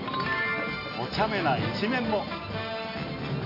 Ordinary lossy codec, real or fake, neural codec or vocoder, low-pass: MP3, 32 kbps; real; none; 5.4 kHz